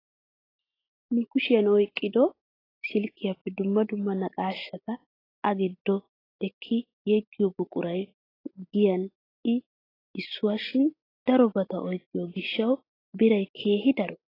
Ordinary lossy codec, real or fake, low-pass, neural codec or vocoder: AAC, 24 kbps; real; 5.4 kHz; none